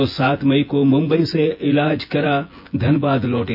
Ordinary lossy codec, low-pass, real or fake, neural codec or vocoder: none; 5.4 kHz; fake; vocoder, 24 kHz, 100 mel bands, Vocos